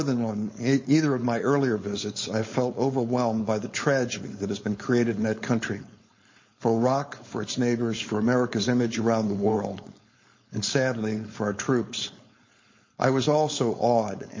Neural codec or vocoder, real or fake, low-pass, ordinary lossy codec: codec, 16 kHz, 4.8 kbps, FACodec; fake; 7.2 kHz; MP3, 32 kbps